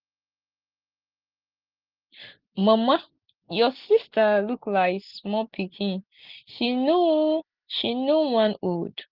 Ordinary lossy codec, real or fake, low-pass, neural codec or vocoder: Opus, 16 kbps; real; 5.4 kHz; none